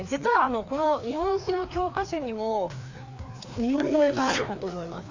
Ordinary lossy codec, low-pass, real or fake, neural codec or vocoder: AAC, 48 kbps; 7.2 kHz; fake; codec, 16 kHz, 2 kbps, FreqCodec, larger model